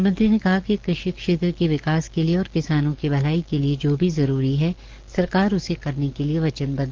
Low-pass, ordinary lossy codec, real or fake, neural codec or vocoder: 7.2 kHz; Opus, 16 kbps; fake; codec, 44.1 kHz, 7.8 kbps, DAC